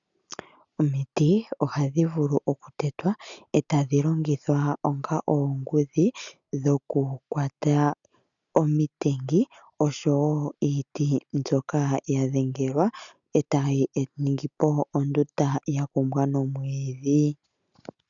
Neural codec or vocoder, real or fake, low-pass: none; real; 7.2 kHz